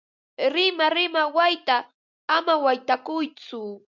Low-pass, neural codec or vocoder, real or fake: 7.2 kHz; none; real